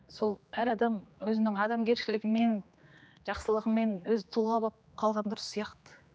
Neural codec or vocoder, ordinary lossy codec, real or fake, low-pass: codec, 16 kHz, 2 kbps, X-Codec, HuBERT features, trained on general audio; none; fake; none